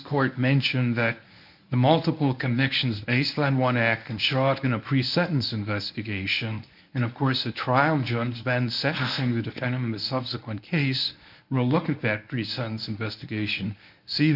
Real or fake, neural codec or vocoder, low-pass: fake; codec, 24 kHz, 0.9 kbps, WavTokenizer, medium speech release version 1; 5.4 kHz